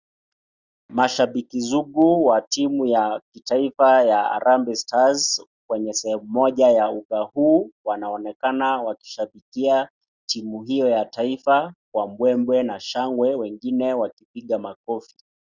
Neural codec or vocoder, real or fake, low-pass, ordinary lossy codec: none; real; 7.2 kHz; Opus, 64 kbps